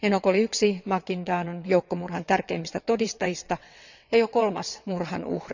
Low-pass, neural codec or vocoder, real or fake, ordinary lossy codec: 7.2 kHz; vocoder, 22.05 kHz, 80 mel bands, WaveNeXt; fake; none